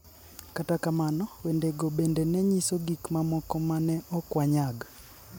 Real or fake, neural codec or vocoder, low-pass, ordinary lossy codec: real; none; none; none